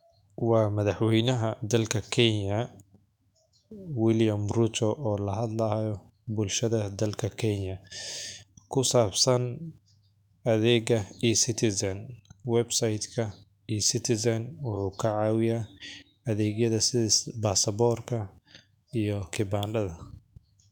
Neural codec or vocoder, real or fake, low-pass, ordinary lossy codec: autoencoder, 48 kHz, 128 numbers a frame, DAC-VAE, trained on Japanese speech; fake; 19.8 kHz; none